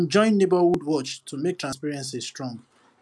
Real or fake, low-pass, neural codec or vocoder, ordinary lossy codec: real; none; none; none